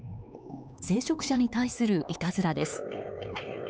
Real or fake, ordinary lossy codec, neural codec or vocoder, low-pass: fake; none; codec, 16 kHz, 4 kbps, X-Codec, HuBERT features, trained on LibriSpeech; none